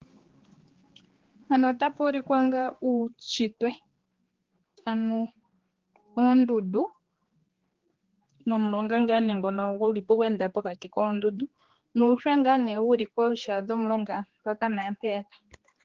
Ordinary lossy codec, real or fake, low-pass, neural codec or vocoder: Opus, 16 kbps; fake; 7.2 kHz; codec, 16 kHz, 2 kbps, X-Codec, HuBERT features, trained on general audio